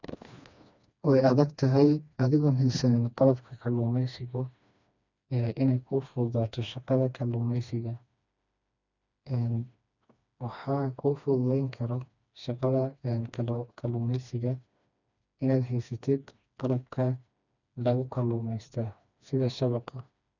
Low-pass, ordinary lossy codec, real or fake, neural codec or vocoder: 7.2 kHz; none; fake; codec, 16 kHz, 2 kbps, FreqCodec, smaller model